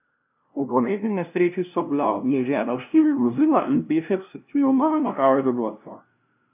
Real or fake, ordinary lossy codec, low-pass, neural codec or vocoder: fake; none; 3.6 kHz; codec, 16 kHz, 0.5 kbps, FunCodec, trained on LibriTTS, 25 frames a second